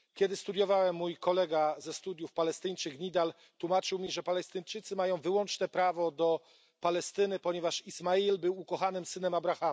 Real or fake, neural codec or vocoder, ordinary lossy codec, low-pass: real; none; none; none